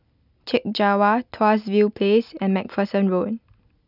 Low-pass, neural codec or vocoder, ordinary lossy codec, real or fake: 5.4 kHz; none; none; real